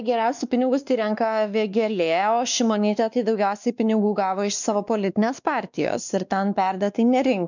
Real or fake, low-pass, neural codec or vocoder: fake; 7.2 kHz; codec, 16 kHz, 2 kbps, X-Codec, WavLM features, trained on Multilingual LibriSpeech